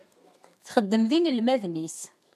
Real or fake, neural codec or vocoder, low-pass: fake; codec, 32 kHz, 1.9 kbps, SNAC; 14.4 kHz